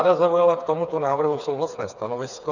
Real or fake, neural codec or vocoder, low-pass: fake; codec, 16 kHz, 4 kbps, FreqCodec, smaller model; 7.2 kHz